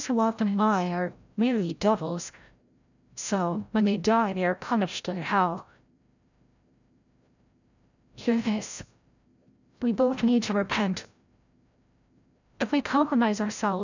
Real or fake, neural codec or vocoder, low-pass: fake; codec, 16 kHz, 0.5 kbps, FreqCodec, larger model; 7.2 kHz